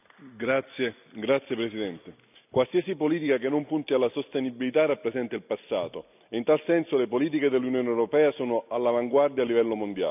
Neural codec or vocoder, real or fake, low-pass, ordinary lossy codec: none; real; 3.6 kHz; none